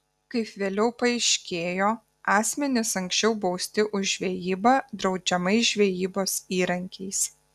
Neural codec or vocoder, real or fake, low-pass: none; real; 14.4 kHz